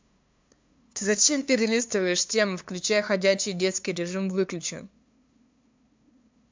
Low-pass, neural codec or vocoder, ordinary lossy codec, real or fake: 7.2 kHz; codec, 16 kHz, 2 kbps, FunCodec, trained on LibriTTS, 25 frames a second; MP3, 64 kbps; fake